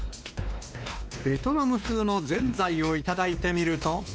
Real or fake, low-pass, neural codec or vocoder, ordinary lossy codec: fake; none; codec, 16 kHz, 2 kbps, X-Codec, WavLM features, trained on Multilingual LibriSpeech; none